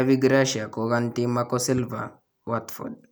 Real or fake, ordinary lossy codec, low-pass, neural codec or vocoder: real; none; none; none